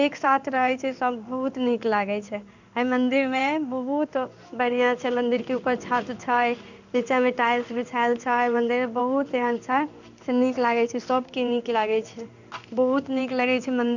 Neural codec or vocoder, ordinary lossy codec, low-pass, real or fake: codec, 16 kHz in and 24 kHz out, 1 kbps, XY-Tokenizer; none; 7.2 kHz; fake